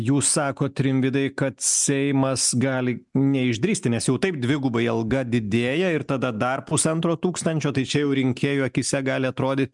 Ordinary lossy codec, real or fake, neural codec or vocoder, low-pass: MP3, 96 kbps; real; none; 10.8 kHz